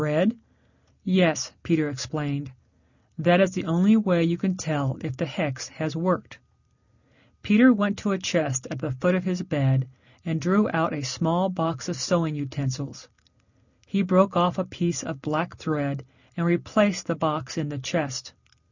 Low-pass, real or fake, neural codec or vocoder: 7.2 kHz; real; none